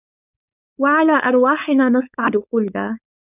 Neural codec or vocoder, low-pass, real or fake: codec, 16 kHz, 4.8 kbps, FACodec; 3.6 kHz; fake